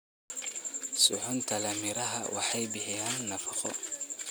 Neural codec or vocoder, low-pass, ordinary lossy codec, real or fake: none; none; none; real